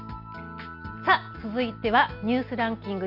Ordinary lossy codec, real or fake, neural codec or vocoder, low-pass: none; real; none; 5.4 kHz